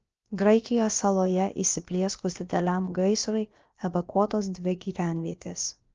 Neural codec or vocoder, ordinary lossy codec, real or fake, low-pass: codec, 16 kHz, about 1 kbps, DyCAST, with the encoder's durations; Opus, 24 kbps; fake; 7.2 kHz